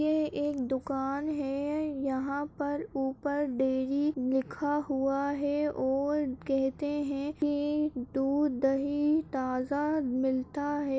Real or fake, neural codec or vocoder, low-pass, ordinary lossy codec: real; none; none; none